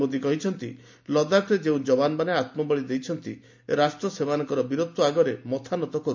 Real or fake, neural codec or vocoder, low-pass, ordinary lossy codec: real; none; 7.2 kHz; MP3, 48 kbps